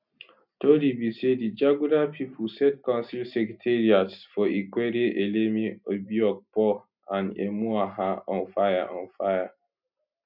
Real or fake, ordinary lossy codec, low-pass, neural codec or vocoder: real; none; 5.4 kHz; none